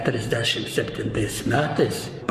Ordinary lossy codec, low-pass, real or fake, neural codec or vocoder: Opus, 64 kbps; 14.4 kHz; fake; codec, 44.1 kHz, 7.8 kbps, Pupu-Codec